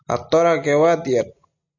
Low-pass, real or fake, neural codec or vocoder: 7.2 kHz; real; none